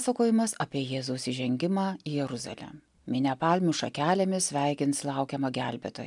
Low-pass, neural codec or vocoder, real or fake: 10.8 kHz; none; real